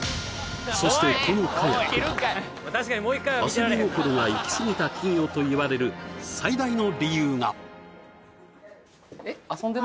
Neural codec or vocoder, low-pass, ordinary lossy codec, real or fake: none; none; none; real